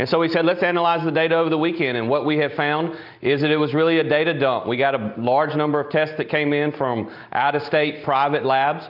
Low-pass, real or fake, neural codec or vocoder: 5.4 kHz; real; none